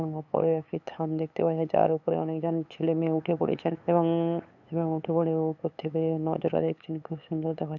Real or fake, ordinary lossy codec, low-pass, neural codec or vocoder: fake; none; 7.2 kHz; codec, 16 kHz, 8 kbps, FunCodec, trained on LibriTTS, 25 frames a second